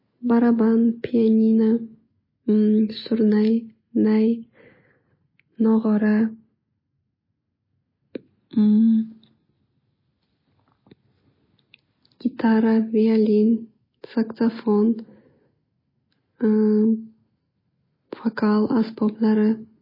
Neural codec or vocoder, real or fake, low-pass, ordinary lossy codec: none; real; 5.4 kHz; MP3, 24 kbps